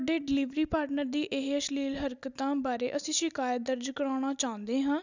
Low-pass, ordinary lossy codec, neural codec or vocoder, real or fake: 7.2 kHz; none; none; real